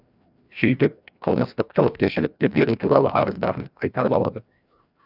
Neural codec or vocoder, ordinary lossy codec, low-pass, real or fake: codec, 16 kHz, 1 kbps, FreqCodec, larger model; none; 5.4 kHz; fake